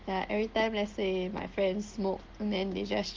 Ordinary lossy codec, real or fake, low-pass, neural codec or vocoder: Opus, 16 kbps; real; 7.2 kHz; none